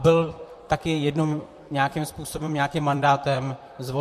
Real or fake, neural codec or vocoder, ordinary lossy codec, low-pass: fake; vocoder, 44.1 kHz, 128 mel bands, Pupu-Vocoder; MP3, 64 kbps; 14.4 kHz